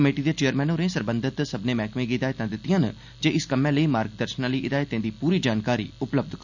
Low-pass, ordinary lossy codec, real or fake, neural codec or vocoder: 7.2 kHz; none; real; none